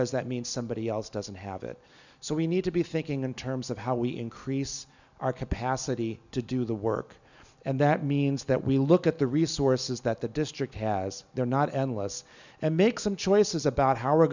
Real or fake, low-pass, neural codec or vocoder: real; 7.2 kHz; none